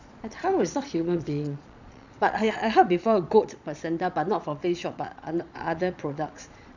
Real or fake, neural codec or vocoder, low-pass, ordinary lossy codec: fake; vocoder, 22.05 kHz, 80 mel bands, Vocos; 7.2 kHz; none